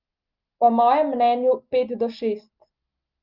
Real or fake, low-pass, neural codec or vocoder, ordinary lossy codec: real; 5.4 kHz; none; Opus, 32 kbps